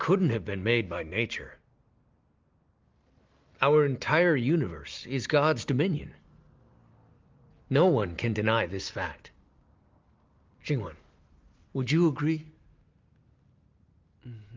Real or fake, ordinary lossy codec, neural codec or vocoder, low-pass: real; Opus, 32 kbps; none; 7.2 kHz